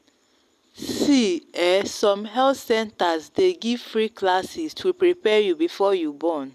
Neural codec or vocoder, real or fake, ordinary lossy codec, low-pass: none; real; none; 14.4 kHz